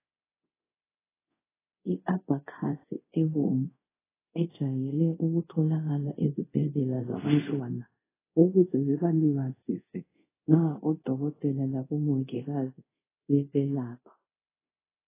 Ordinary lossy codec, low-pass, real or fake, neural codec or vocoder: AAC, 16 kbps; 3.6 kHz; fake; codec, 24 kHz, 0.5 kbps, DualCodec